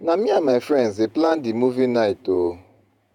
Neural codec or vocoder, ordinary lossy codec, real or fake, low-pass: vocoder, 48 kHz, 128 mel bands, Vocos; none; fake; 19.8 kHz